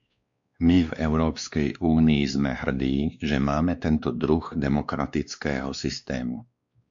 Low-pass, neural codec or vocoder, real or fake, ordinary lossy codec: 7.2 kHz; codec, 16 kHz, 2 kbps, X-Codec, WavLM features, trained on Multilingual LibriSpeech; fake; MP3, 64 kbps